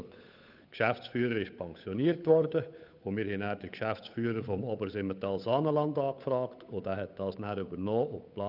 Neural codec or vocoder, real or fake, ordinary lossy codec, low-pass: codec, 16 kHz, 8 kbps, FunCodec, trained on Chinese and English, 25 frames a second; fake; none; 5.4 kHz